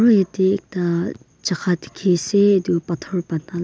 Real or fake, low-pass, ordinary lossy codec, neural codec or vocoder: real; none; none; none